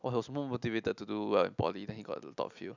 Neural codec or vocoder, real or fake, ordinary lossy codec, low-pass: none; real; none; 7.2 kHz